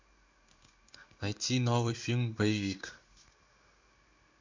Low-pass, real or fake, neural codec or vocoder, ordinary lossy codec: 7.2 kHz; fake; codec, 16 kHz in and 24 kHz out, 1 kbps, XY-Tokenizer; MP3, 64 kbps